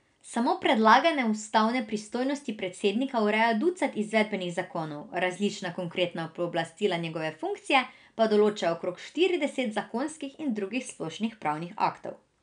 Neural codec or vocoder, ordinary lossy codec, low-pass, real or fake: none; none; 9.9 kHz; real